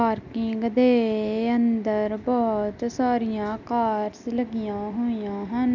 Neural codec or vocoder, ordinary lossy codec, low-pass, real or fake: none; none; 7.2 kHz; real